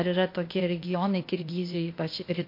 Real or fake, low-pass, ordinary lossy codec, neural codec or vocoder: fake; 5.4 kHz; MP3, 32 kbps; codec, 16 kHz, 0.8 kbps, ZipCodec